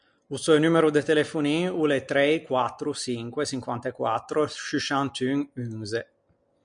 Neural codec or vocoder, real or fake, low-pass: none; real; 9.9 kHz